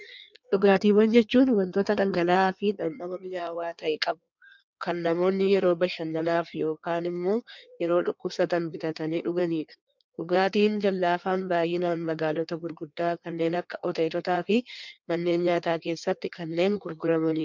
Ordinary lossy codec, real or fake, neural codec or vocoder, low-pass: MP3, 64 kbps; fake; codec, 16 kHz in and 24 kHz out, 1.1 kbps, FireRedTTS-2 codec; 7.2 kHz